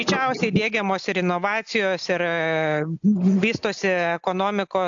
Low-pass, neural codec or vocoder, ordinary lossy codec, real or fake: 7.2 kHz; none; AAC, 64 kbps; real